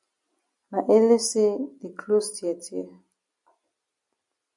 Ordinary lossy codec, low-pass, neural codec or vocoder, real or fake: MP3, 64 kbps; 10.8 kHz; none; real